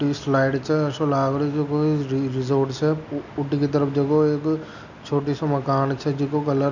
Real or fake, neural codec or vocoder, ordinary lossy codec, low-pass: real; none; none; 7.2 kHz